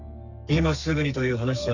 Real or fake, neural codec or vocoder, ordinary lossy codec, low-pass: fake; codec, 32 kHz, 1.9 kbps, SNAC; none; 7.2 kHz